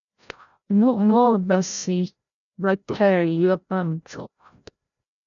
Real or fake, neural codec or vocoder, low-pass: fake; codec, 16 kHz, 0.5 kbps, FreqCodec, larger model; 7.2 kHz